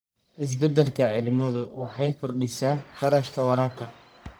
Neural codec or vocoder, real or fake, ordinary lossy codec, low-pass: codec, 44.1 kHz, 1.7 kbps, Pupu-Codec; fake; none; none